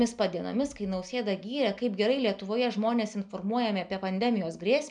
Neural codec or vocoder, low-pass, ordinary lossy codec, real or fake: none; 9.9 kHz; MP3, 96 kbps; real